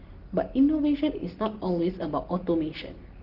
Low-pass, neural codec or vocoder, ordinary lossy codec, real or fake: 5.4 kHz; none; Opus, 16 kbps; real